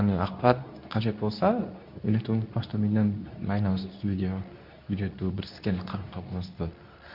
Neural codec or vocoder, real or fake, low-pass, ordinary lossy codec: codec, 24 kHz, 0.9 kbps, WavTokenizer, medium speech release version 2; fake; 5.4 kHz; none